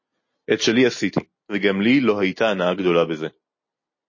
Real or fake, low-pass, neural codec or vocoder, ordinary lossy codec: real; 7.2 kHz; none; MP3, 32 kbps